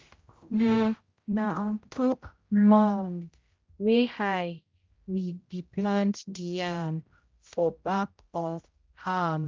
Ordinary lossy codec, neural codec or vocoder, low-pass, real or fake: Opus, 32 kbps; codec, 16 kHz, 0.5 kbps, X-Codec, HuBERT features, trained on general audio; 7.2 kHz; fake